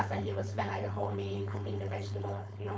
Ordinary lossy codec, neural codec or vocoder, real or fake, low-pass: none; codec, 16 kHz, 4.8 kbps, FACodec; fake; none